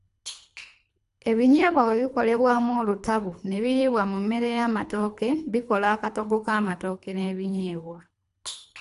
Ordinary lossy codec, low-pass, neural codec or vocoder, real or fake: none; 10.8 kHz; codec, 24 kHz, 3 kbps, HILCodec; fake